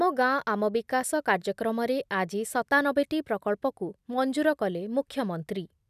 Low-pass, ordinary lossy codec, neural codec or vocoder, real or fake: 14.4 kHz; none; none; real